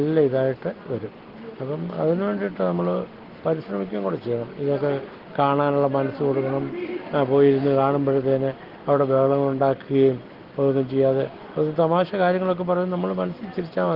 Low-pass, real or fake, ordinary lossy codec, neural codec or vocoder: 5.4 kHz; real; Opus, 16 kbps; none